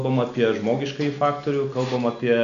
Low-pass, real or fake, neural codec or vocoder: 7.2 kHz; real; none